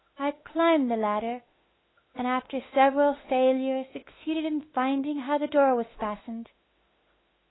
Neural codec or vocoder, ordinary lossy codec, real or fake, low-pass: autoencoder, 48 kHz, 32 numbers a frame, DAC-VAE, trained on Japanese speech; AAC, 16 kbps; fake; 7.2 kHz